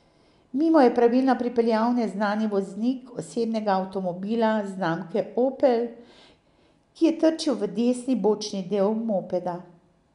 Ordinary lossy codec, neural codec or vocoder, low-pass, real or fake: none; none; 10.8 kHz; real